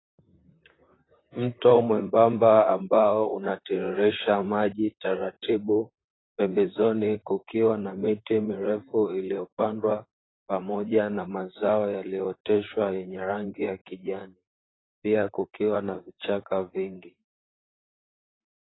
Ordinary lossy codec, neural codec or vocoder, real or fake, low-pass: AAC, 16 kbps; vocoder, 44.1 kHz, 128 mel bands, Pupu-Vocoder; fake; 7.2 kHz